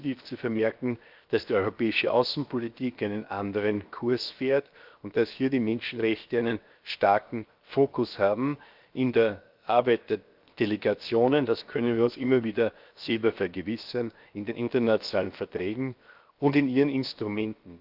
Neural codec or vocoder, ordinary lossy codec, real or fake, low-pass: codec, 16 kHz, 0.7 kbps, FocalCodec; Opus, 24 kbps; fake; 5.4 kHz